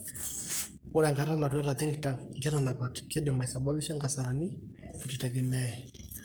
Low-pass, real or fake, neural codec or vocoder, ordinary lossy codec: none; fake; codec, 44.1 kHz, 3.4 kbps, Pupu-Codec; none